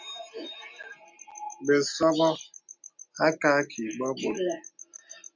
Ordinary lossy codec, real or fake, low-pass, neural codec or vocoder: MP3, 48 kbps; real; 7.2 kHz; none